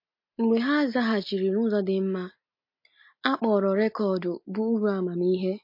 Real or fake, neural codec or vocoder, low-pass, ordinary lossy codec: real; none; 5.4 kHz; MP3, 32 kbps